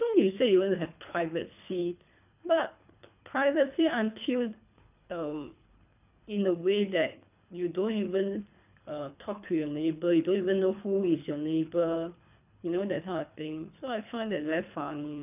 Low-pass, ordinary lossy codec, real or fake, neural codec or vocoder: 3.6 kHz; none; fake; codec, 24 kHz, 3 kbps, HILCodec